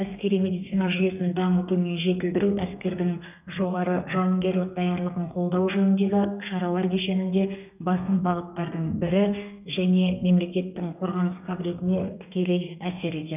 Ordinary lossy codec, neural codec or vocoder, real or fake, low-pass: none; codec, 32 kHz, 1.9 kbps, SNAC; fake; 3.6 kHz